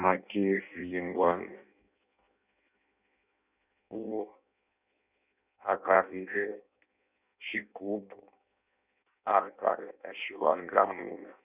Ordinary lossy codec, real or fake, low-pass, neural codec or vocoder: none; fake; 3.6 kHz; codec, 16 kHz in and 24 kHz out, 0.6 kbps, FireRedTTS-2 codec